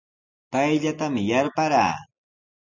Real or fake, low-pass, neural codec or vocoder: real; 7.2 kHz; none